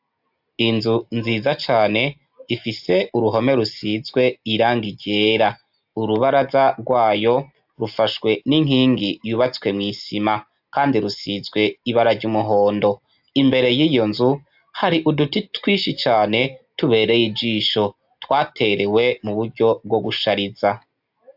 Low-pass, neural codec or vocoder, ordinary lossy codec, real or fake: 5.4 kHz; none; AAC, 48 kbps; real